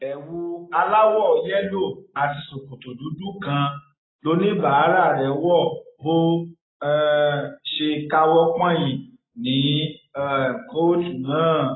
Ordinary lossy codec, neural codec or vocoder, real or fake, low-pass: AAC, 16 kbps; none; real; 7.2 kHz